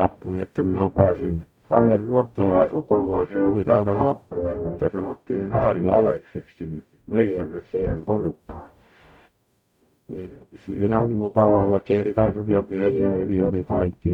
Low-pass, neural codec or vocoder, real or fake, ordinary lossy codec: 19.8 kHz; codec, 44.1 kHz, 0.9 kbps, DAC; fake; none